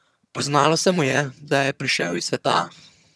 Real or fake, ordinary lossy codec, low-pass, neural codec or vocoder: fake; none; none; vocoder, 22.05 kHz, 80 mel bands, HiFi-GAN